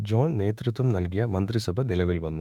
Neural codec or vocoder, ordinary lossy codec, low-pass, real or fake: autoencoder, 48 kHz, 32 numbers a frame, DAC-VAE, trained on Japanese speech; none; 19.8 kHz; fake